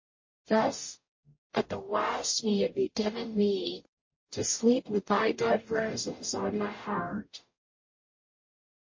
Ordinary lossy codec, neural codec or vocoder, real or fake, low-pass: MP3, 32 kbps; codec, 44.1 kHz, 0.9 kbps, DAC; fake; 7.2 kHz